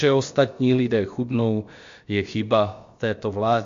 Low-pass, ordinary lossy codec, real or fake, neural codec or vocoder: 7.2 kHz; MP3, 48 kbps; fake; codec, 16 kHz, about 1 kbps, DyCAST, with the encoder's durations